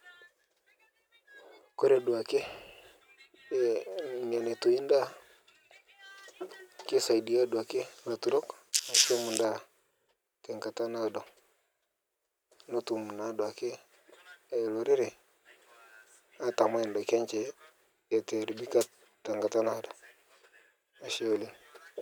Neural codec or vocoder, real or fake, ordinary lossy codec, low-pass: none; real; none; none